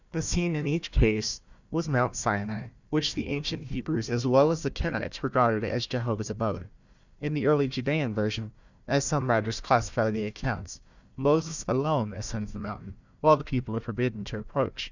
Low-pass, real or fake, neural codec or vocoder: 7.2 kHz; fake; codec, 16 kHz, 1 kbps, FunCodec, trained on Chinese and English, 50 frames a second